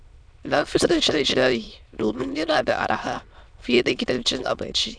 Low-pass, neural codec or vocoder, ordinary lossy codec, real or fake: 9.9 kHz; autoencoder, 22.05 kHz, a latent of 192 numbers a frame, VITS, trained on many speakers; none; fake